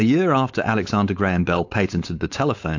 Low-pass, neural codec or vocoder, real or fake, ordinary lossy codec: 7.2 kHz; codec, 16 kHz, 4.8 kbps, FACodec; fake; AAC, 48 kbps